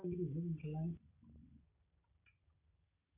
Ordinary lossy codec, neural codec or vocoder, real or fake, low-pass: none; none; real; 3.6 kHz